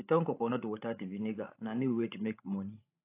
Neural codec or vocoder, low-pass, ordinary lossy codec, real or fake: codec, 16 kHz, 16 kbps, FreqCodec, larger model; 3.6 kHz; AAC, 24 kbps; fake